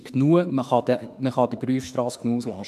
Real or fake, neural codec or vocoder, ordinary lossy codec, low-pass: fake; autoencoder, 48 kHz, 32 numbers a frame, DAC-VAE, trained on Japanese speech; none; 14.4 kHz